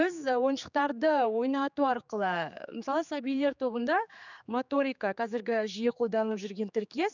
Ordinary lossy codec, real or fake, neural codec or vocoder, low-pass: none; fake; codec, 16 kHz, 4 kbps, X-Codec, HuBERT features, trained on general audio; 7.2 kHz